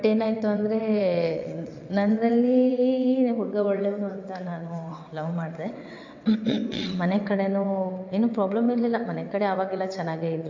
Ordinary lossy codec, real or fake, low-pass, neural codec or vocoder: AAC, 48 kbps; fake; 7.2 kHz; vocoder, 22.05 kHz, 80 mel bands, WaveNeXt